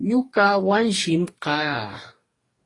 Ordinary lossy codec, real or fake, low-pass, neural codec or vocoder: AAC, 32 kbps; fake; 10.8 kHz; codec, 44.1 kHz, 2.6 kbps, DAC